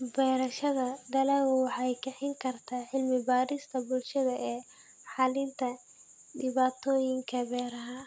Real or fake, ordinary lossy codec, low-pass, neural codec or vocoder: real; none; none; none